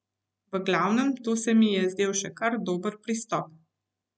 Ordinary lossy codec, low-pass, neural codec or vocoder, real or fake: none; none; none; real